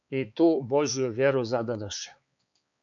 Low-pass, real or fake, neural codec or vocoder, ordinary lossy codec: 7.2 kHz; fake; codec, 16 kHz, 4 kbps, X-Codec, HuBERT features, trained on balanced general audio; AAC, 64 kbps